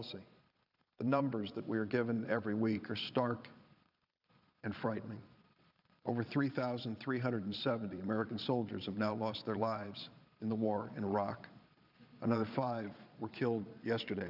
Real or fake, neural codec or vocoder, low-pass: fake; vocoder, 22.05 kHz, 80 mel bands, Vocos; 5.4 kHz